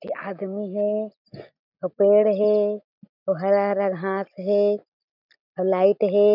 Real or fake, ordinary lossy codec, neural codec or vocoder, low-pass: real; none; none; 5.4 kHz